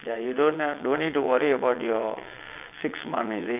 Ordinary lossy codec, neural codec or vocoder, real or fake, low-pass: none; vocoder, 22.05 kHz, 80 mel bands, WaveNeXt; fake; 3.6 kHz